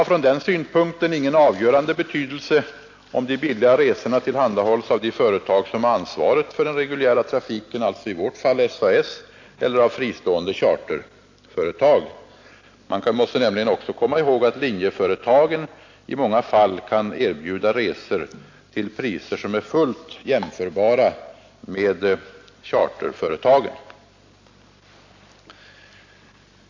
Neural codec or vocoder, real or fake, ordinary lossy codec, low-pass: none; real; AAC, 48 kbps; 7.2 kHz